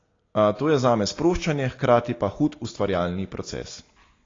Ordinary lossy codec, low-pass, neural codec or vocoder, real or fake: AAC, 32 kbps; 7.2 kHz; none; real